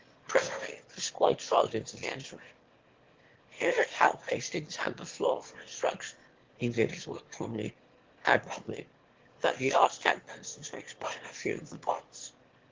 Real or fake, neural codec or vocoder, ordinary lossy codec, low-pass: fake; autoencoder, 22.05 kHz, a latent of 192 numbers a frame, VITS, trained on one speaker; Opus, 16 kbps; 7.2 kHz